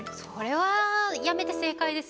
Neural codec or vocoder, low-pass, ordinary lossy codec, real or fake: none; none; none; real